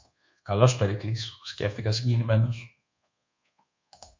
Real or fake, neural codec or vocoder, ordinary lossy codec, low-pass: fake; codec, 24 kHz, 1.2 kbps, DualCodec; MP3, 48 kbps; 7.2 kHz